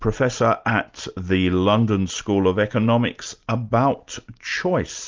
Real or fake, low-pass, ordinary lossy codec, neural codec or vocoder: real; 7.2 kHz; Opus, 24 kbps; none